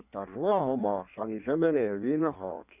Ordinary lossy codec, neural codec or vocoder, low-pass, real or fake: none; codec, 16 kHz in and 24 kHz out, 1.1 kbps, FireRedTTS-2 codec; 3.6 kHz; fake